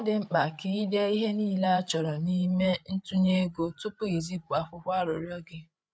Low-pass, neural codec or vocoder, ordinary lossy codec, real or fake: none; codec, 16 kHz, 8 kbps, FreqCodec, larger model; none; fake